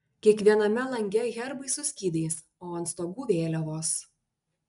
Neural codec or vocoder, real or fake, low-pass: none; real; 10.8 kHz